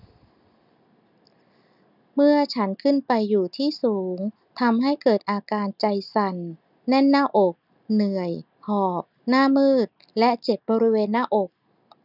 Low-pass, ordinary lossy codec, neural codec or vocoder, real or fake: 5.4 kHz; none; none; real